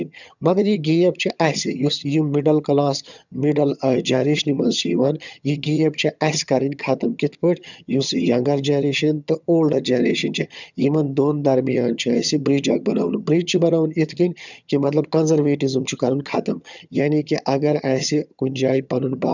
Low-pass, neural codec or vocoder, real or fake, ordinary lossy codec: 7.2 kHz; vocoder, 22.05 kHz, 80 mel bands, HiFi-GAN; fake; none